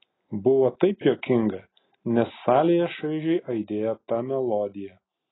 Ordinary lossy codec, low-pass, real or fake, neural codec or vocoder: AAC, 16 kbps; 7.2 kHz; real; none